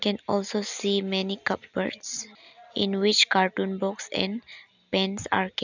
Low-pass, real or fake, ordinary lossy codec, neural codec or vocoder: 7.2 kHz; real; none; none